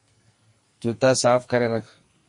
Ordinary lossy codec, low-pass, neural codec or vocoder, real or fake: MP3, 48 kbps; 10.8 kHz; codec, 44.1 kHz, 2.6 kbps, SNAC; fake